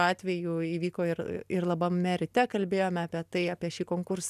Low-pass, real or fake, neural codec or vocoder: 14.4 kHz; real; none